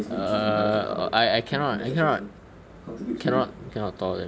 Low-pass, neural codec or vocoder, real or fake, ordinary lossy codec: none; none; real; none